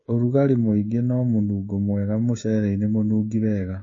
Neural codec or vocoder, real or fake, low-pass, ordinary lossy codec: codec, 16 kHz, 16 kbps, FreqCodec, smaller model; fake; 7.2 kHz; MP3, 32 kbps